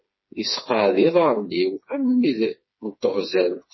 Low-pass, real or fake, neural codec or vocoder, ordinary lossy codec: 7.2 kHz; fake; codec, 16 kHz, 4 kbps, FreqCodec, smaller model; MP3, 24 kbps